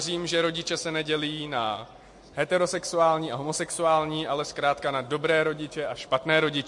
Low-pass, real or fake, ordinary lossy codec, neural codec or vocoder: 10.8 kHz; real; MP3, 48 kbps; none